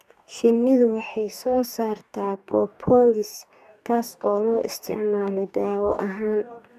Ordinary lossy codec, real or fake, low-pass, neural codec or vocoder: none; fake; 14.4 kHz; codec, 44.1 kHz, 2.6 kbps, DAC